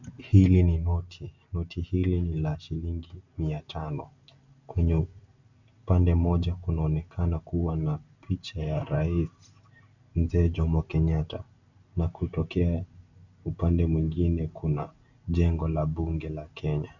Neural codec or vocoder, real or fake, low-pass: none; real; 7.2 kHz